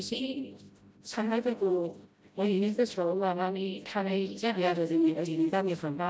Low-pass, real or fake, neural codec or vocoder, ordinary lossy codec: none; fake; codec, 16 kHz, 0.5 kbps, FreqCodec, smaller model; none